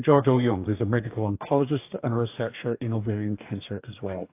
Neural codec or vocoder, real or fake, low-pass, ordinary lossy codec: codec, 44.1 kHz, 2.6 kbps, DAC; fake; 3.6 kHz; AAC, 24 kbps